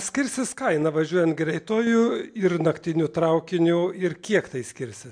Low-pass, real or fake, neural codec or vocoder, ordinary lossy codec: 9.9 kHz; real; none; MP3, 64 kbps